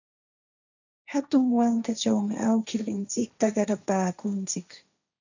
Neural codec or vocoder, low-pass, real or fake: codec, 16 kHz, 1.1 kbps, Voila-Tokenizer; 7.2 kHz; fake